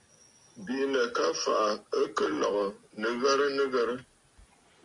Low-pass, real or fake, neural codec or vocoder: 10.8 kHz; real; none